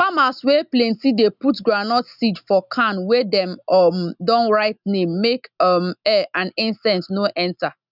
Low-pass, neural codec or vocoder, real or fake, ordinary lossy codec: 5.4 kHz; none; real; none